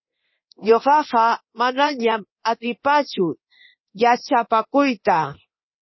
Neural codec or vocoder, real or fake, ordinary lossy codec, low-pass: codec, 24 kHz, 0.9 kbps, DualCodec; fake; MP3, 24 kbps; 7.2 kHz